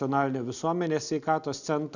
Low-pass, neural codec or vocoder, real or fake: 7.2 kHz; none; real